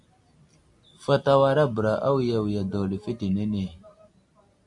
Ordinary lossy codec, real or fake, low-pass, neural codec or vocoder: AAC, 64 kbps; real; 10.8 kHz; none